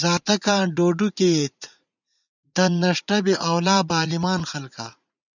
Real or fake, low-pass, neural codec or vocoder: fake; 7.2 kHz; vocoder, 44.1 kHz, 80 mel bands, Vocos